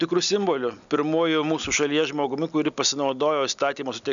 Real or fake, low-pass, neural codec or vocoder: real; 7.2 kHz; none